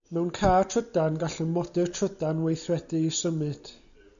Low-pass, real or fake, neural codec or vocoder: 7.2 kHz; real; none